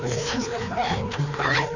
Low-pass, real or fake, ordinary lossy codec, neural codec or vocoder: 7.2 kHz; fake; none; codec, 16 kHz, 2 kbps, FreqCodec, larger model